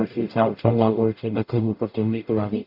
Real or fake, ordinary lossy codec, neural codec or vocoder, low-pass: fake; none; codec, 44.1 kHz, 0.9 kbps, DAC; 5.4 kHz